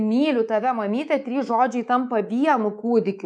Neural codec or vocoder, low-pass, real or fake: codec, 24 kHz, 3.1 kbps, DualCodec; 9.9 kHz; fake